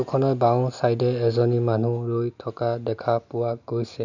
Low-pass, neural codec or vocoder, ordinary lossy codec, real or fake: 7.2 kHz; none; none; real